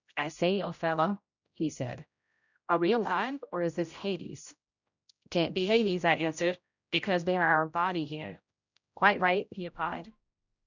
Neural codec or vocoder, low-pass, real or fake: codec, 16 kHz, 0.5 kbps, X-Codec, HuBERT features, trained on general audio; 7.2 kHz; fake